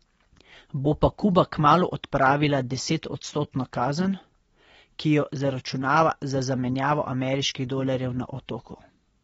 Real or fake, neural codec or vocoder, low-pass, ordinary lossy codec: real; none; 19.8 kHz; AAC, 24 kbps